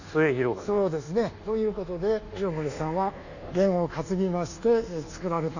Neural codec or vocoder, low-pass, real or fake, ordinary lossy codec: codec, 24 kHz, 1.2 kbps, DualCodec; 7.2 kHz; fake; none